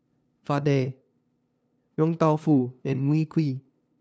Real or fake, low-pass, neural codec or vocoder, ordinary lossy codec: fake; none; codec, 16 kHz, 2 kbps, FunCodec, trained on LibriTTS, 25 frames a second; none